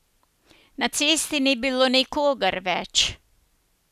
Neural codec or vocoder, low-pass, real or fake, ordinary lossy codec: none; 14.4 kHz; real; none